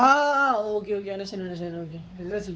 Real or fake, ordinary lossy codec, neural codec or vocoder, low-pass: fake; none; codec, 16 kHz, 4 kbps, X-Codec, WavLM features, trained on Multilingual LibriSpeech; none